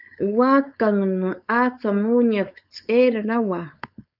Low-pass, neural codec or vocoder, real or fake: 5.4 kHz; codec, 16 kHz, 4.8 kbps, FACodec; fake